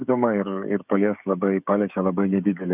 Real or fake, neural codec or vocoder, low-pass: fake; codec, 16 kHz, 16 kbps, FreqCodec, smaller model; 3.6 kHz